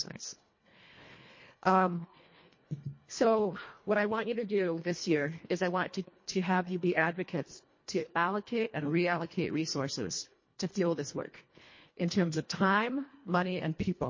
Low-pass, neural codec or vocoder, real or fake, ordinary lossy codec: 7.2 kHz; codec, 24 kHz, 1.5 kbps, HILCodec; fake; MP3, 32 kbps